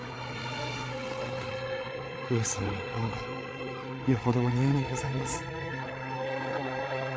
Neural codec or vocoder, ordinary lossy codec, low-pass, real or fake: codec, 16 kHz, 8 kbps, FreqCodec, larger model; none; none; fake